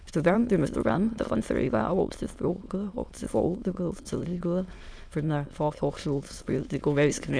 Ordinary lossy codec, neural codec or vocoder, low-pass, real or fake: none; autoencoder, 22.05 kHz, a latent of 192 numbers a frame, VITS, trained on many speakers; none; fake